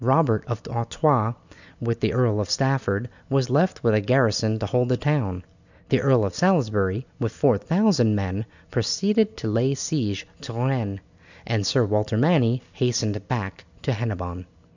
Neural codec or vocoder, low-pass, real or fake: none; 7.2 kHz; real